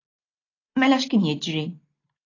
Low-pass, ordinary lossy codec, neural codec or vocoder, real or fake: 7.2 kHz; AAC, 32 kbps; none; real